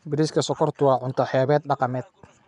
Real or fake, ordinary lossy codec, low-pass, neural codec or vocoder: fake; none; 10.8 kHz; vocoder, 24 kHz, 100 mel bands, Vocos